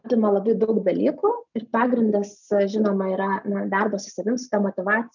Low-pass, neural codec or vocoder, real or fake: 7.2 kHz; none; real